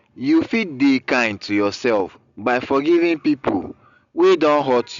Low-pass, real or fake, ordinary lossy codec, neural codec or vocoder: 7.2 kHz; real; Opus, 64 kbps; none